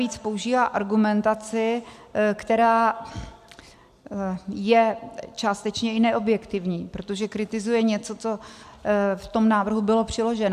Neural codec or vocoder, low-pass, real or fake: none; 14.4 kHz; real